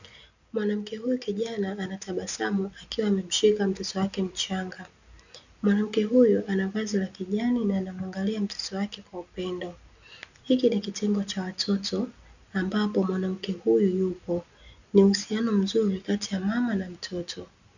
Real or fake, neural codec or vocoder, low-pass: real; none; 7.2 kHz